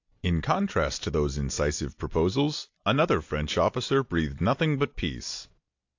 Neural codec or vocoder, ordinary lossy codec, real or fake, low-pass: none; AAC, 48 kbps; real; 7.2 kHz